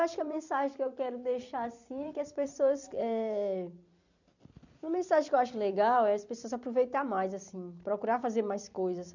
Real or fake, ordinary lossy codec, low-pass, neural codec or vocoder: fake; none; 7.2 kHz; vocoder, 44.1 kHz, 128 mel bands every 256 samples, BigVGAN v2